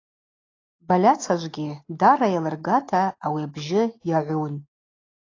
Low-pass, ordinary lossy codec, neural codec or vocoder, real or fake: 7.2 kHz; AAC, 32 kbps; none; real